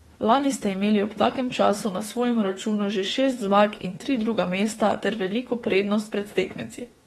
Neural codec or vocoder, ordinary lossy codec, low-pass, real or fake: autoencoder, 48 kHz, 32 numbers a frame, DAC-VAE, trained on Japanese speech; AAC, 32 kbps; 19.8 kHz; fake